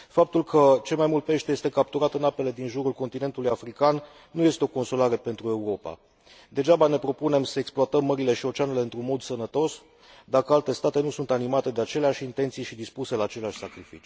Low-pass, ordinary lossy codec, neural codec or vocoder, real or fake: none; none; none; real